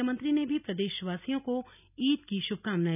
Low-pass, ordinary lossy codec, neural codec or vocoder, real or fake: 3.6 kHz; none; none; real